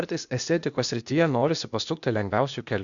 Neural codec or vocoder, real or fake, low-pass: codec, 16 kHz, 0.8 kbps, ZipCodec; fake; 7.2 kHz